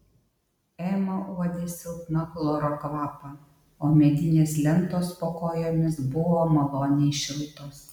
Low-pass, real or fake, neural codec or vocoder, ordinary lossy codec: 19.8 kHz; real; none; MP3, 96 kbps